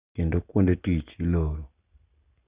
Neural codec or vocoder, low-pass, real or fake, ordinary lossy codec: none; 3.6 kHz; real; Opus, 64 kbps